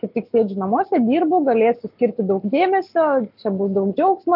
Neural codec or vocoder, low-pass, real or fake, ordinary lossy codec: none; 5.4 kHz; real; AAC, 48 kbps